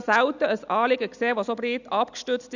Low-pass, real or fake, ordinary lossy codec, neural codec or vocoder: 7.2 kHz; real; none; none